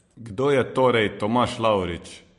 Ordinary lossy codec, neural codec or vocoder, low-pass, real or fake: MP3, 48 kbps; none; 14.4 kHz; real